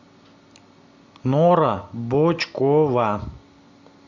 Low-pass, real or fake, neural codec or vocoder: 7.2 kHz; real; none